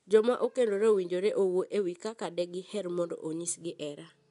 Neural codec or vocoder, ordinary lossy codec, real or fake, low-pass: none; none; real; 10.8 kHz